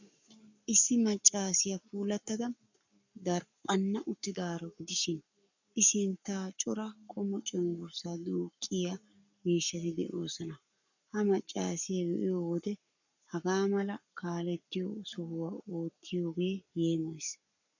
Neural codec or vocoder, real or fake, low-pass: codec, 44.1 kHz, 7.8 kbps, Pupu-Codec; fake; 7.2 kHz